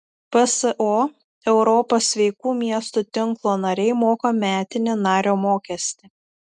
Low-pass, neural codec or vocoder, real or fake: 10.8 kHz; none; real